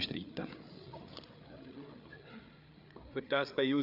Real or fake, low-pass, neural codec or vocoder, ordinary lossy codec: fake; 5.4 kHz; codec, 16 kHz, 8 kbps, FreqCodec, larger model; none